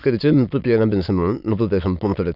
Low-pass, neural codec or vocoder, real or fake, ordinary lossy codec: 5.4 kHz; autoencoder, 22.05 kHz, a latent of 192 numbers a frame, VITS, trained on many speakers; fake; none